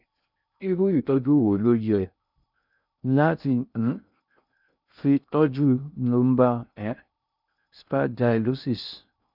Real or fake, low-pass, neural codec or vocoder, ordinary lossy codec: fake; 5.4 kHz; codec, 16 kHz in and 24 kHz out, 0.6 kbps, FocalCodec, streaming, 2048 codes; none